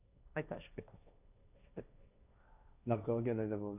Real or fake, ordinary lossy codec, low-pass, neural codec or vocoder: fake; none; 3.6 kHz; codec, 16 kHz, 1.1 kbps, Voila-Tokenizer